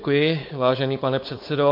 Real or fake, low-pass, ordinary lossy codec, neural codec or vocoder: fake; 5.4 kHz; MP3, 32 kbps; codec, 16 kHz, 4.8 kbps, FACodec